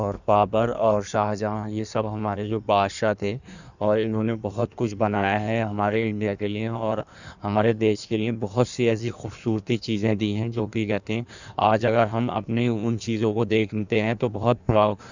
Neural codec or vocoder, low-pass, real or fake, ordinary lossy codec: codec, 16 kHz in and 24 kHz out, 1.1 kbps, FireRedTTS-2 codec; 7.2 kHz; fake; none